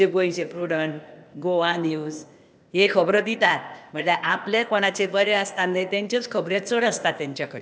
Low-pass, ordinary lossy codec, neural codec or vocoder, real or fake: none; none; codec, 16 kHz, 0.8 kbps, ZipCodec; fake